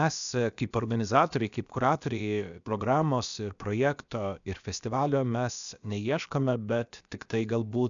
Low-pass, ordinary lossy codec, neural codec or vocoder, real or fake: 7.2 kHz; MP3, 96 kbps; codec, 16 kHz, 0.7 kbps, FocalCodec; fake